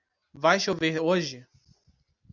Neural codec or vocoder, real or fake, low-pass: none; real; 7.2 kHz